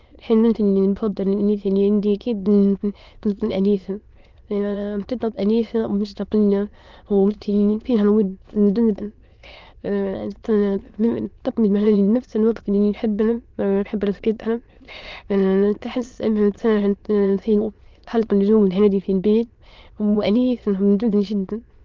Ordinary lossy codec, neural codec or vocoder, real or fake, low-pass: Opus, 32 kbps; autoencoder, 22.05 kHz, a latent of 192 numbers a frame, VITS, trained on many speakers; fake; 7.2 kHz